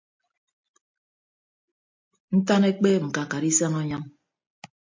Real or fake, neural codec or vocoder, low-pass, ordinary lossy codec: real; none; 7.2 kHz; MP3, 48 kbps